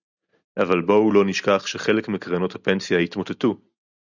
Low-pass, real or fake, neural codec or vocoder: 7.2 kHz; real; none